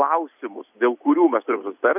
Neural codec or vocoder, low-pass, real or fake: none; 3.6 kHz; real